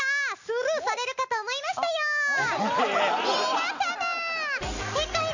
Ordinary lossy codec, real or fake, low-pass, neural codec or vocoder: none; real; 7.2 kHz; none